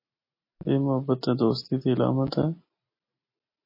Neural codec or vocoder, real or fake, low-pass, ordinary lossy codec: none; real; 5.4 kHz; MP3, 24 kbps